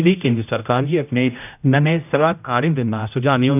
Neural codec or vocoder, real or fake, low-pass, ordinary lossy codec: codec, 16 kHz, 0.5 kbps, X-Codec, HuBERT features, trained on general audio; fake; 3.6 kHz; none